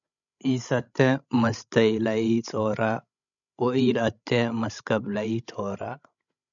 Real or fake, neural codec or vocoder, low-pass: fake; codec, 16 kHz, 16 kbps, FreqCodec, larger model; 7.2 kHz